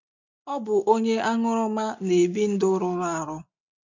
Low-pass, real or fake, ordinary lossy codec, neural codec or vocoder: 7.2 kHz; real; none; none